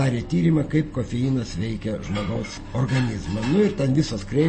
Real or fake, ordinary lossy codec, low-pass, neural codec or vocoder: real; MP3, 32 kbps; 9.9 kHz; none